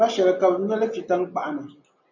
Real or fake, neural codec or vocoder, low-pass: real; none; 7.2 kHz